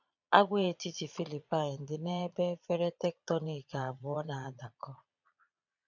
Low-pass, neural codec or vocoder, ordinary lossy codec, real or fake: 7.2 kHz; vocoder, 22.05 kHz, 80 mel bands, Vocos; none; fake